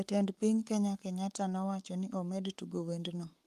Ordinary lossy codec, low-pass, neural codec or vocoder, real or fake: Opus, 32 kbps; 14.4 kHz; codec, 44.1 kHz, 7.8 kbps, Pupu-Codec; fake